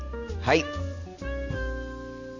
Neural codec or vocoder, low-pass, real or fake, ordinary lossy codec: none; 7.2 kHz; real; none